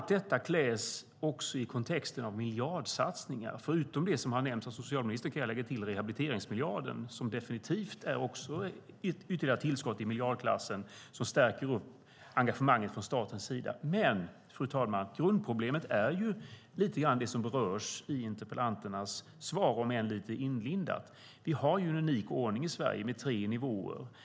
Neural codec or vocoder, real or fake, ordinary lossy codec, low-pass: none; real; none; none